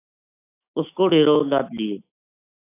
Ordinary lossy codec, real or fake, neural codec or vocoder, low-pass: AAC, 24 kbps; fake; autoencoder, 48 kHz, 128 numbers a frame, DAC-VAE, trained on Japanese speech; 3.6 kHz